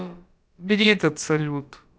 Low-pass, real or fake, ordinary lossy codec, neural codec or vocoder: none; fake; none; codec, 16 kHz, about 1 kbps, DyCAST, with the encoder's durations